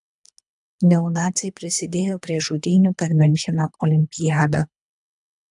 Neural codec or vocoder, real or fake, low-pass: codec, 24 kHz, 3 kbps, HILCodec; fake; 10.8 kHz